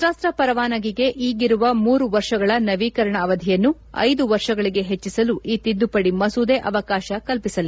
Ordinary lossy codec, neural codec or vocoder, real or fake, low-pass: none; none; real; none